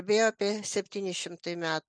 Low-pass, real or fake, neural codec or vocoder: 9.9 kHz; real; none